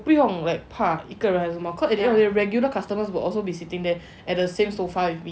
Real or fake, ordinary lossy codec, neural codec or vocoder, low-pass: real; none; none; none